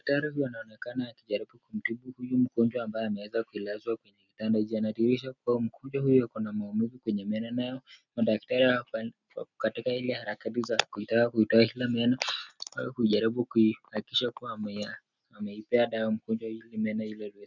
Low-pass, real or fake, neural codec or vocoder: 7.2 kHz; real; none